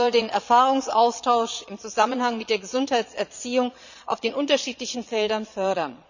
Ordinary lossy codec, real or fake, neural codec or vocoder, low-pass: none; fake; vocoder, 22.05 kHz, 80 mel bands, Vocos; 7.2 kHz